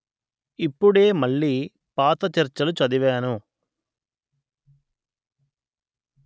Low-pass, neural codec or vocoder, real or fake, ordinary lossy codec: none; none; real; none